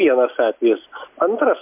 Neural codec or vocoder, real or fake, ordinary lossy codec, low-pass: none; real; MP3, 32 kbps; 3.6 kHz